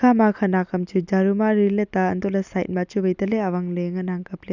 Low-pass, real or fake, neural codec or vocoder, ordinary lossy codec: 7.2 kHz; real; none; none